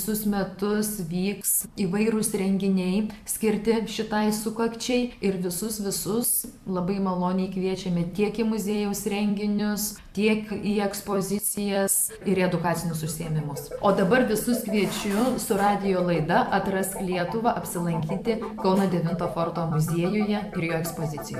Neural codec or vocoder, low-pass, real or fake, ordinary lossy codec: vocoder, 44.1 kHz, 128 mel bands every 256 samples, BigVGAN v2; 14.4 kHz; fake; AAC, 96 kbps